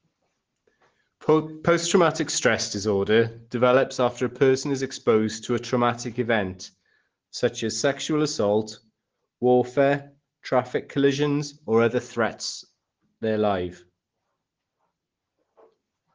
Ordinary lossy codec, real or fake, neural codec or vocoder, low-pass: Opus, 16 kbps; real; none; 7.2 kHz